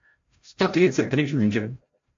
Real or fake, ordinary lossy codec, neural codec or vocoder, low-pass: fake; AAC, 48 kbps; codec, 16 kHz, 0.5 kbps, FreqCodec, larger model; 7.2 kHz